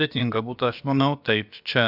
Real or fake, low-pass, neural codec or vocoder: fake; 5.4 kHz; codec, 16 kHz, about 1 kbps, DyCAST, with the encoder's durations